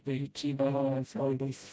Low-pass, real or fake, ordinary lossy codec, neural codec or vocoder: none; fake; none; codec, 16 kHz, 0.5 kbps, FreqCodec, smaller model